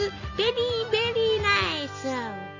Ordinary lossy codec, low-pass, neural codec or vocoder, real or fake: MP3, 64 kbps; 7.2 kHz; none; real